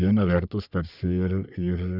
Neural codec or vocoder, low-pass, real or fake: codec, 44.1 kHz, 3.4 kbps, Pupu-Codec; 5.4 kHz; fake